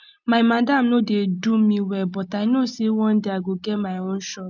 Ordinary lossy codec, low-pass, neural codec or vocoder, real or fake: none; 7.2 kHz; none; real